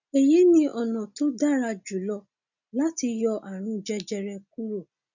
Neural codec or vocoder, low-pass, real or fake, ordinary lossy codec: none; 7.2 kHz; real; none